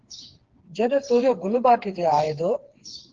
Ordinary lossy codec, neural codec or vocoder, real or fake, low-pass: Opus, 16 kbps; codec, 16 kHz, 4 kbps, FreqCodec, smaller model; fake; 7.2 kHz